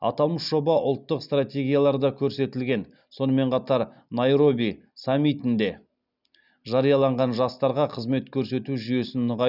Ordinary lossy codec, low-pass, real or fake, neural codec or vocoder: none; 5.4 kHz; real; none